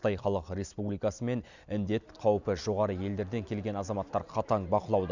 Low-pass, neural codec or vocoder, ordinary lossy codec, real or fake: 7.2 kHz; none; none; real